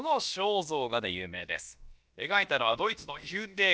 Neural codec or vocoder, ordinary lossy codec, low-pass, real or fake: codec, 16 kHz, about 1 kbps, DyCAST, with the encoder's durations; none; none; fake